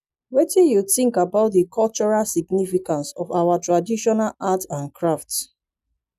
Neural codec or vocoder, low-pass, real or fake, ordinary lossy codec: none; 14.4 kHz; real; none